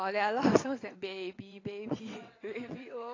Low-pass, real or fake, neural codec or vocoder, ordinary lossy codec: 7.2 kHz; fake; vocoder, 22.05 kHz, 80 mel bands, WaveNeXt; AAC, 32 kbps